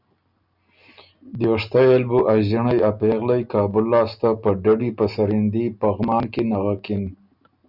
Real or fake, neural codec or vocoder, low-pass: real; none; 5.4 kHz